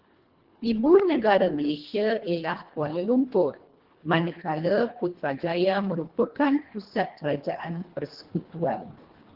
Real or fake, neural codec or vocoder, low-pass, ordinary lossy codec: fake; codec, 24 kHz, 1.5 kbps, HILCodec; 5.4 kHz; Opus, 16 kbps